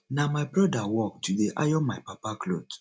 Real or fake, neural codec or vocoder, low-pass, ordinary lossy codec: real; none; none; none